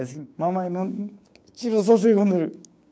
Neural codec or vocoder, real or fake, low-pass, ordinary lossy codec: codec, 16 kHz, 6 kbps, DAC; fake; none; none